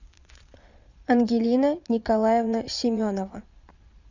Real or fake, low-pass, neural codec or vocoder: fake; 7.2 kHz; vocoder, 22.05 kHz, 80 mel bands, Vocos